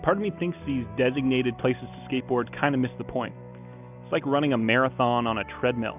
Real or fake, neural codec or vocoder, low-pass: real; none; 3.6 kHz